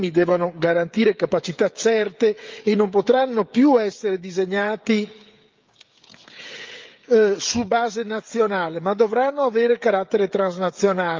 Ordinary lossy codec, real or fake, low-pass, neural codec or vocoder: Opus, 32 kbps; fake; 7.2 kHz; codec, 16 kHz, 16 kbps, FreqCodec, smaller model